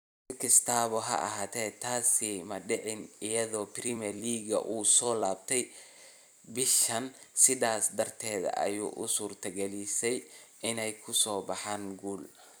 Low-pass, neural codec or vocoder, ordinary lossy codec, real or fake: none; vocoder, 44.1 kHz, 128 mel bands every 256 samples, BigVGAN v2; none; fake